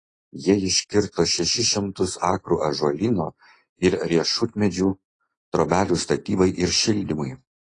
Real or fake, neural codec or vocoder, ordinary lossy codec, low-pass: real; none; AAC, 32 kbps; 9.9 kHz